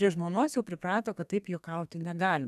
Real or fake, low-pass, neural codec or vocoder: fake; 14.4 kHz; codec, 32 kHz, 1.9 kbps, SNAC